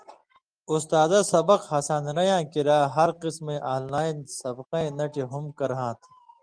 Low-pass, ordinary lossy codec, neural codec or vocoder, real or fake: 9.9 kHz; Opus, 24 kbps; none; real